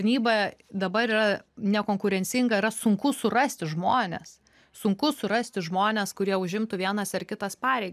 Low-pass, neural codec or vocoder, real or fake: 14.4 kHz; none; real